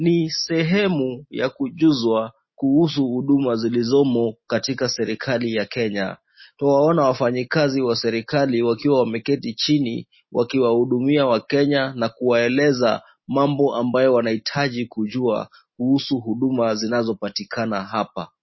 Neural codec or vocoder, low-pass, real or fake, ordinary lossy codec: none; 7.2 kHz; real; MP3, 24 kbps